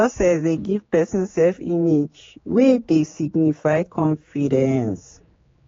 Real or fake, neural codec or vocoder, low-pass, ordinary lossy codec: fake; codec, 16 kHz, 4 kbps, X-Codec, HuBERT features, trained on general audio; 7.2 kHz; AAC, 32 kbps